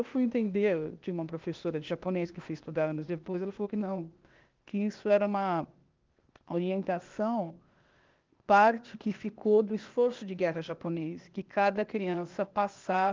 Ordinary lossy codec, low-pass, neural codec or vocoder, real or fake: Opus, 32 kbps; 7.2 kHz; codec, 16 kHz, 0.8 kbps, ZipCodec; fake